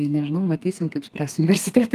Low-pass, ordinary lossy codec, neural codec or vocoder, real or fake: 14.4 kHz; Opus, 32 kbps; codec, 32 kHz, 1.9 kbps, SNAC; fake